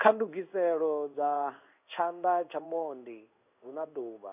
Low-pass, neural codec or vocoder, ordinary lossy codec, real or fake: 3.6 kHz; codec, 16 kHz in and 24 kHz out, 1 kbps, XY-Tokenizer; none; fake